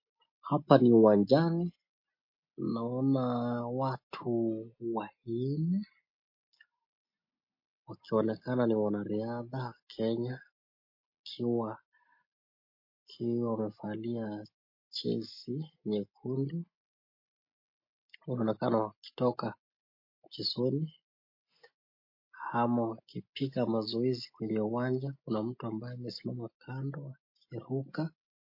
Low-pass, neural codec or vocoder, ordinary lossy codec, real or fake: 5.4 kHz; none; MP3, 32 kbps; real